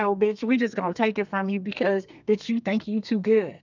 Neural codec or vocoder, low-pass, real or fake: codec, 32 kHz, 1.9 kbps, SNAC; 7.2 kHz; fake